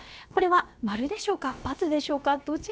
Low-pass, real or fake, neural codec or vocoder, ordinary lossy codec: none; fake; codec, 16 kHz, about 1 kbps, DyCAST, with the encoder's durations; none